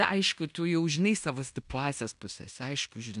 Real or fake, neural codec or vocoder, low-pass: fake; codec, 16 kHz in and 24 kHz out, 0.9 kbps, LongCat-Audio-Codec, fine tuned four codebook decoder; 10.8 kHz